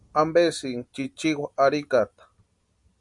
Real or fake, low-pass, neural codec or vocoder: real; 10.8 kHz; none